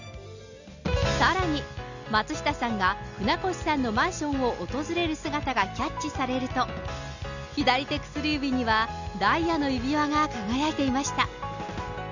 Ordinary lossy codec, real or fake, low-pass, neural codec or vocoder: none; real; 7.2 kHz; none